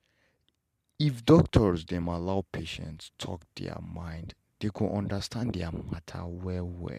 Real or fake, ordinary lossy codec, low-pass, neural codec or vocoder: real; MP3, 96 kbps; 14.4 kHz; none